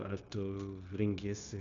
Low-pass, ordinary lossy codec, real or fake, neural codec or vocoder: 7.2 kHz; MP3, 64 kbps; fake; codec, 16 kHz, 0.9 kbps, LongCat-Audio-Codec